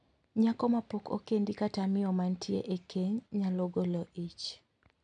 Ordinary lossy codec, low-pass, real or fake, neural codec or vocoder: none; 9.9 kHz; real; none